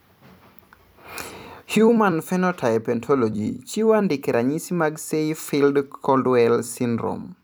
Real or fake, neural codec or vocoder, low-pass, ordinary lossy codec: fake; vocoder, 44.1 kHz, 128 mel bands every 256 samples, BigVGAN v2; none; none